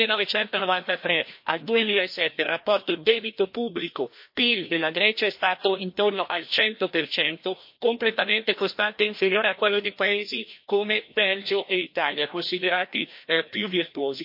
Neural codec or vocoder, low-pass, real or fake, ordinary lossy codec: codec, 16 kHz, 1 kbps, FreqCodec, larger model; 5.4 kHz; fake; MP3, 32 kbps